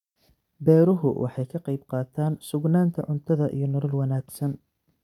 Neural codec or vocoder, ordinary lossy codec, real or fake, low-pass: none; none; real; 19.8 kHz